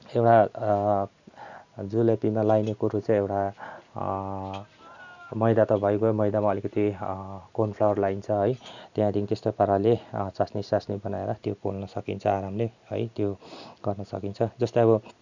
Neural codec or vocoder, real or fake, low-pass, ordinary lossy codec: none; real; 7.2 kHz; none